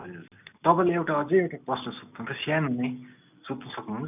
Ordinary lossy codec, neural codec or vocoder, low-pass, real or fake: none; none; 3.6 kHz; real